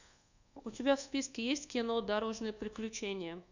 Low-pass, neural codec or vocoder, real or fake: 7.2 kHz; codec, 24 kHz, 1.2 kbps, DualCodec; fake